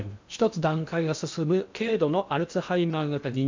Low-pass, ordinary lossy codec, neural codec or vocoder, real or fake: 7.2 kHz; MP3, 64 kbps; codec, 16 kHz in and 24 kHz out, 0.8 kbps, FocalCodec, streaming, 65536 codes; fake